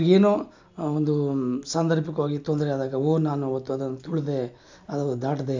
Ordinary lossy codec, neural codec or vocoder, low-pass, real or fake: MP3, 64 kbps; none; 7.2 kHz; real